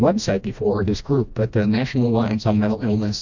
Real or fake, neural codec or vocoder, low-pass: fake; codec, 16 kHz, 1 kbps, FreqCodec, smaller model; 7.2 kHz